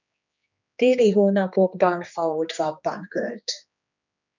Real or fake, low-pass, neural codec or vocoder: fake; 7.2 kHz; codec, 16 kHz, 2 kbps, X-Codec, HuBERT features, trained on general audio